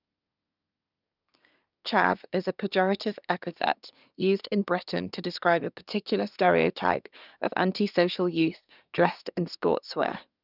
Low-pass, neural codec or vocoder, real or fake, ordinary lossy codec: 5.4 kHz; codec, 24 kHz, 1 kbps, SNAC; fake; none